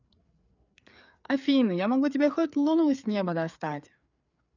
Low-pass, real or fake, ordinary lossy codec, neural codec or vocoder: 7.2 kHz; fake; none; codec, 16 kHz, 4 kbps, FreqCodec, larger model